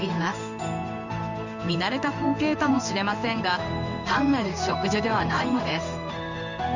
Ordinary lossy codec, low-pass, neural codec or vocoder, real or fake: Opus, 64 kbps; 7.2 kHz; codec, 16 kHz in and 24 kHz out, 1 kbps, XY-Tokenizer; fake